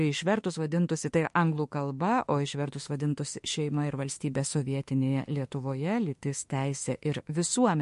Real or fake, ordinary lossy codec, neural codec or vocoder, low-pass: fake; MP3, 48 kbps; autoencoder, 48 kHz, 32 numbers a frame, DAC-VAE, trained on Japanese speech; 14.4 kHz